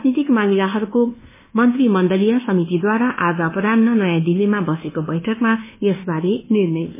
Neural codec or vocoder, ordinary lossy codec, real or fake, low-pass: codec, 24 kHz, 1.2 kbps, DualCodec; MP3, 16 kbps; fake; 3.6 kHz